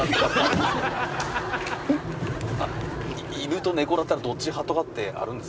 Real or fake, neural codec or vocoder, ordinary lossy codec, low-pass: real; none; none; none